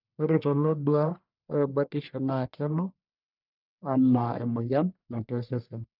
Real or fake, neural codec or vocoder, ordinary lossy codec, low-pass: fake; codec, 44.1 kHz, 1.7 kbps, Pupu-Codec; none; 5.4 kHz